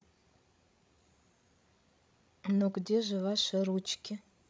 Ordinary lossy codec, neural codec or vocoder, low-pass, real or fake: none; codec, 16 kHz, 16 kbps, FreqCodec, larger model; none; fake